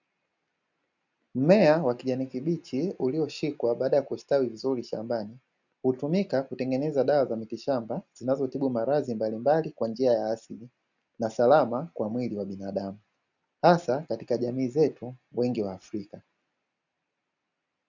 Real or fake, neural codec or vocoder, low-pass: real; none; 7.2 kHz